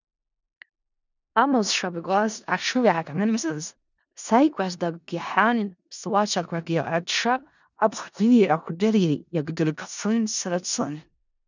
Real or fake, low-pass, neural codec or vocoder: fake; 7.2 kHz; codec, 16 kHz in and 24 kHz out, 0.4 kbps, LongCat-Audio-Codec, four codebook decoder